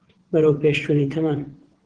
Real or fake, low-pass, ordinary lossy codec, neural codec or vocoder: fake; 9.9 kHz; Opus, 16 kbps; vocoder, 22.05 kHz, 80 mel bands, WaveNeXt